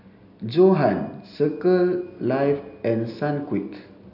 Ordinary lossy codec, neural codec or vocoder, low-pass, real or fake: none; none; 5.4 kHz; real